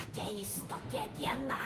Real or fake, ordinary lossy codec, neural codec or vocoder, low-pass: fake; Opus, 24 kbps; autoencoder, 48 kHz, 32 numbers a frame, DAC-VAE, trained on Japanese speech; 14.4 kHz